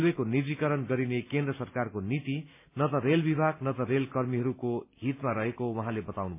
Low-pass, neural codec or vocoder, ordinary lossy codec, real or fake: 3.6 kHz; none; MP3, 24 kbps; real